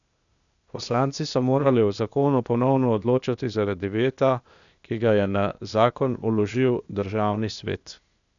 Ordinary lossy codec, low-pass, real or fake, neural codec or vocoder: none; 7.2 kHz; fake; codec, 16 kHz, 0.8 kbps, ZipCodec